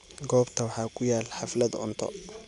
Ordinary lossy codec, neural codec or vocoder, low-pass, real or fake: none; none; 10.8 kHz; real